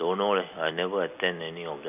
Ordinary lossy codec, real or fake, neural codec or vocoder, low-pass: none; real; none; 3.6 kHz